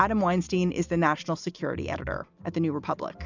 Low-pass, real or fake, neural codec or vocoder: 7.2 kHz; real; none